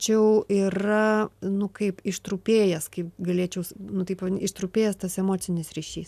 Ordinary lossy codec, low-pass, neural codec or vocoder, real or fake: AAC, 96 kbps; 14.4 kHz; none; real